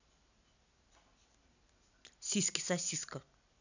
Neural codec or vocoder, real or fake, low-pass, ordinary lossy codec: none; real; 7.2 kHz; none